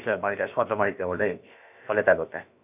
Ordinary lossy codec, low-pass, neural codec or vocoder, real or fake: none; 3.6 kHz; codec, 16 kHz, about 1 kbps, DyCAST, with the encoder's durations; fake